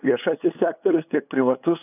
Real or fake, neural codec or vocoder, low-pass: fake; codec, 16 kHz, 8 kbps, FunCodec, trained on LibriTTS, 25 frames a second; 3.6 kHz